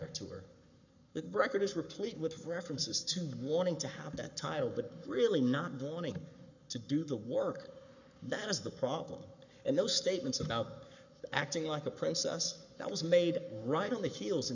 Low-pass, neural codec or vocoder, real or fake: 7.2 kHz; codec, 44.1 kHz, 7.8 kbps, Pupu-Codec; fake